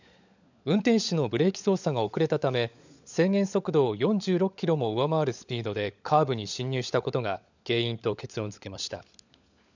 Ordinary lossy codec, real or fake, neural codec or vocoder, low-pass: none; fake; codec, 16 kHz, 16 kbps, FunCodec, trained on LibriTTS, 50 frames a second; 7.2 kHz